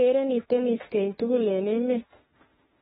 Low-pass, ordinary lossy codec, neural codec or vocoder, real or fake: 19.8 kHz; AAC, 16 kbps; autoencoder, 48 kHz, 32 numbers a frame, DAC-VAE, trained on Japanese speech; fake